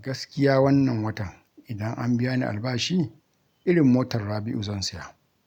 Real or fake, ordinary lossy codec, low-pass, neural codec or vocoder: real; none; 19.8 kHz; none